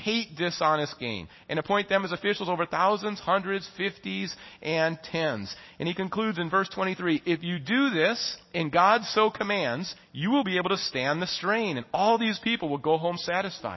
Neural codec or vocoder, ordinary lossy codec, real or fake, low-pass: none; MP3, 24 kbps; real; 7.2 kHz